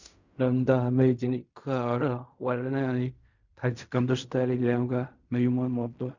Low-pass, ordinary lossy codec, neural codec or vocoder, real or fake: 7.2 kHz; Opus, 64 kbps; codec, 16 kHz in and 24 kHz out, 0.4 kbps, LongCat-Audio-Codec, fine tuned four codebook decoder; fake